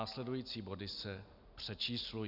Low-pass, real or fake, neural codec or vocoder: 5.4 kHz; real; none